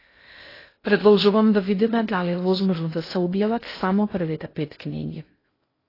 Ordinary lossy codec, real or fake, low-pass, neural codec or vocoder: AAC, 24 kbps; fake; 5.4 kHz; codec, 16 kHz in and 24 kHz out, 0.6 kbps, FocalCodec, streaming, 2048 codes